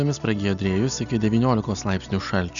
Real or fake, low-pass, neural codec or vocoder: real; 7.2 kHz; none